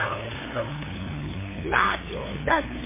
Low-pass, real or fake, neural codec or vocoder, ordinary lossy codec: 3.6 kHz; fake; codec, 16 kHz, 2 kbps, FunCodec, trained on LibriTTS, 25 frames a second; MP3, 16 kbps